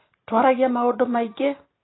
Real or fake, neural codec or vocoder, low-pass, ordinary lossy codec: real; none; 7.2 kHz; AAC, 16 kbps